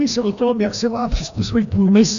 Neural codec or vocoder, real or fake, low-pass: codec, 16 kHz, 1 kbps, FreqCodec, larger model; fake; 7.2 kHz